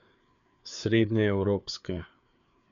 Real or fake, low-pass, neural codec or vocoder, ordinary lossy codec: fake; 7.2 kHz; codec, 16 kHz, 4 kbps, FreqCodec, larger model; none